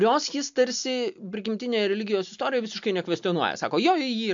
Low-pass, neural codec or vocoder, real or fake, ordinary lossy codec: 7.2 kHz; none; real; MP3, 64 kbps